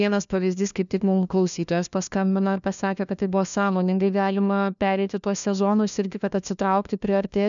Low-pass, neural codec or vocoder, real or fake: 7.2 kHz; codec, 16 kHz, 1 kbps, FunCodec, trained on LibriTTS, 50 frames a second; fake